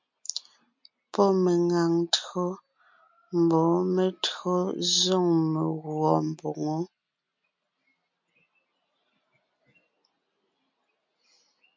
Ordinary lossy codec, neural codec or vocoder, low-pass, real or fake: MP3, 48 kbps; none; 7.2 kHz; real